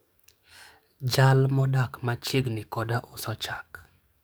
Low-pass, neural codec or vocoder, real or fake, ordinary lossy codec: none; codec, 44.1 kHz, 7.8 kbps, DAC; fake; none